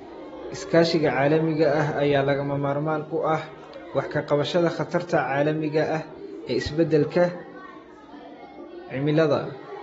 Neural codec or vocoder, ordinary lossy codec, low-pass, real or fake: none; AAC, 24 kbps; 10.8 kHz; real